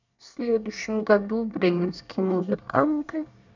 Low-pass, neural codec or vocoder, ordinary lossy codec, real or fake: 7.2 kHz; codec, 24 kHz, 1 kbps, SNAC; none; fake